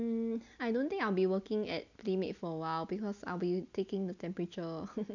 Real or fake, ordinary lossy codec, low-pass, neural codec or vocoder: real; none; 7.2 kHz; none